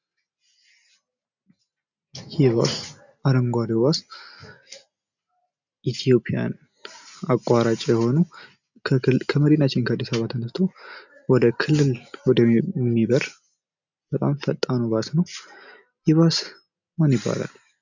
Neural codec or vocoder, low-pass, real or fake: none; 7.2 kHz; real